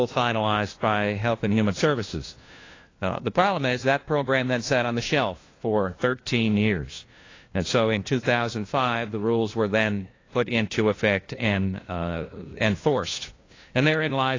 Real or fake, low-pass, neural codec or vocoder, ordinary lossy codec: fake; 7.2 kHz; codec, 16 kHz, 1 kbps, FunCodec, trained on LibriTTS, 50 frames a second; AAC, 32 kbps